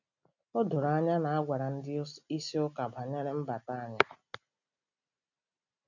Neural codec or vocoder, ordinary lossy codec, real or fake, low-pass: none; none; real; 7.2 kHz